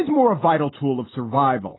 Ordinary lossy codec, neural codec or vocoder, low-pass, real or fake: AAC, 16 kbps; none; 7.2 kHz; real